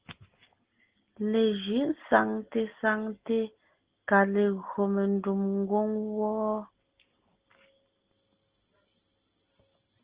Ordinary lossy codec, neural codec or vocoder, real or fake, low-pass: Opus, 16 kbps; none; real; 3.6 kHz